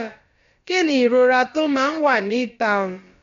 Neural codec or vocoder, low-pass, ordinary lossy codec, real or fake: codec, 16 kHz, about 1 kbps, DyCAST, with the encoder's durations; 7.2 kHz; MP3, 48 kbps; fake